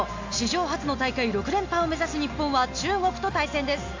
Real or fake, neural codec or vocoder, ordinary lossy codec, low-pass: real; none; AAC, 48 kbps; 7.2 kHz